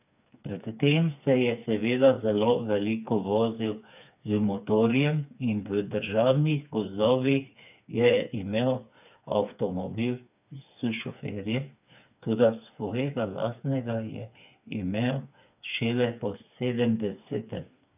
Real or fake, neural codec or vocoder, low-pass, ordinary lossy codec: fake; codec, 16 kHz, 4 kbps, FreqCodec, smaller model; 3.6 kHz; none